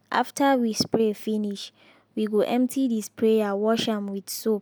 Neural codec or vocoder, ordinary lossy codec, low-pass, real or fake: none; none; none; real